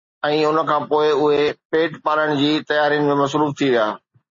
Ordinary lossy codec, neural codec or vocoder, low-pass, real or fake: MP3, 32 kbps; none; 7.2 kHz; real